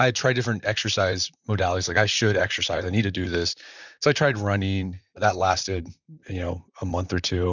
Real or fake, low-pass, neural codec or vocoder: fake; 7.2 kHz; vocoder, 44.1 kHz, 128 mel bands, Pupu-Vocoder